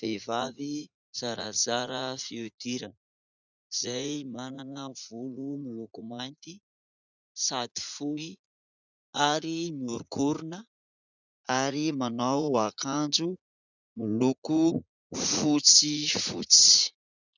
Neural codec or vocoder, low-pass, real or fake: vocoder, 44.1 kHz, 80 mel bands, Vocos; 7.2 kHz; fake